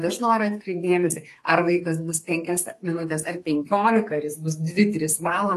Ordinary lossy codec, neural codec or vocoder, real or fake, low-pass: AAC, 48 kbps; codec, 32 kHz, 1.9 kbps, SNAC; fake; 14.4 kHz